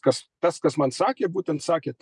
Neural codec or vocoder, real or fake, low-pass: none; real; 10.8 kHz